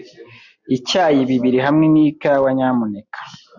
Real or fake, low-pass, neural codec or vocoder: real; 7.2 kHz; none